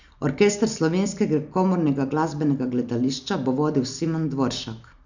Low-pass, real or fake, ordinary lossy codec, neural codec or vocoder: 7.2 kHz; real; none; none